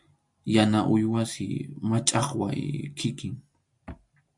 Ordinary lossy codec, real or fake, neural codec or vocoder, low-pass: MP3, 64 kbps; real; none; 10.8 kHz